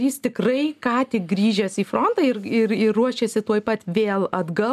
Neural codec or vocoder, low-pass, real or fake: none; 14.4 kHz; real